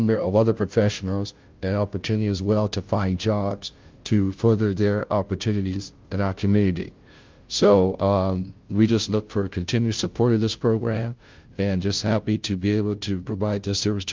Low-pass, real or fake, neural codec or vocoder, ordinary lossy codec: 7.2 kHz; fake; codec, 16 kHz, 0.5 kbps, FunCodec, trained on Chinese and English, 25 frames a second; Opus, 24 kbps